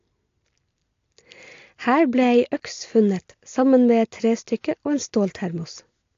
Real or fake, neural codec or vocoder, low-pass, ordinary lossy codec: real; none; 7.2 kHz; AAC, 48 kbps